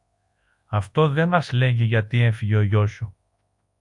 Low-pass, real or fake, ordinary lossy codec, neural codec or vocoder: 10.8 kHz; fake; AAC, 64 kbps; codec, 24 kHz, 0.9 kbps, WavTokenizer, large speech release